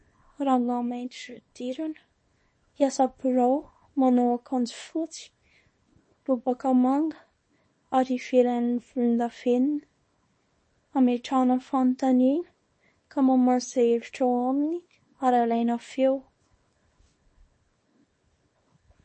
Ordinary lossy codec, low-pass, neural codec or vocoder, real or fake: MP3, 32 kbps; 9.9 kHz; codec, 24 kHz, 0.9 kbps, WavTokenizer, small release; fake